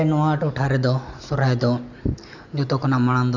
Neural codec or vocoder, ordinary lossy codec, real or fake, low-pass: none; none; real; 7.2 kHz